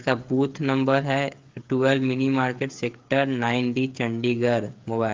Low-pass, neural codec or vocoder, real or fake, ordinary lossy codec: 7.2 kHz; codec, 16 kHz, 8 kbps, FreqCodec, smaller model; fake; Opus, 32 kbps